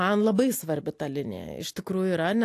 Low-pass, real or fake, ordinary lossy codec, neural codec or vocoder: 14.4 kHz; real; AAC, 64 kbps; none